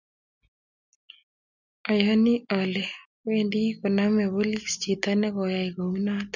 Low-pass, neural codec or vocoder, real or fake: 7.2 kHz; none; real